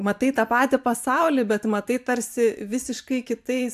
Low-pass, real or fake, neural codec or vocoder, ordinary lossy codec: 14.4 kHz; fake; vocoder, 44.1 kHz, 128 mel bands every 512 samples, BigVGAN v2; AAC, 96 kbps